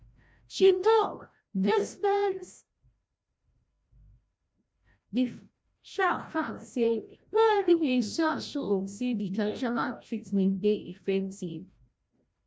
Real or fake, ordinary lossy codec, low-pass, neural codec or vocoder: fake; none; none; codec, 16 kHz, 0.5 kbps, FreqCodec, larger model